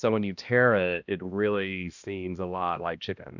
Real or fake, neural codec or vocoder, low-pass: fake; codec, 16 kHz, 1 kbps, X-Codec, HuBERT features, trained on balanced general audio; 7.2 kHz